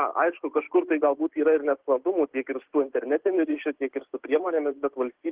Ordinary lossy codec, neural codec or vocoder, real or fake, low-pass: Opus, 16 kbps; none; real; 3.6 kHz